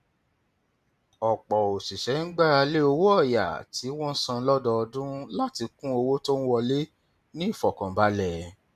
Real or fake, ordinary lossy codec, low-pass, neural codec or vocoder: real; none; 14.4 kHz; none